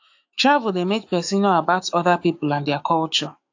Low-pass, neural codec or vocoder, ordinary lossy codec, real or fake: 7.2 kHz; autoencoder, 48 kHz, 128 numbers a frame, DAC-VAE, trained on Japanese speech; AAC, 48 kbps; fake